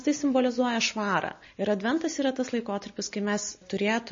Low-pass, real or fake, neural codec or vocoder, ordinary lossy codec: 7.2 kHz; real; none; MP3, 32 kbps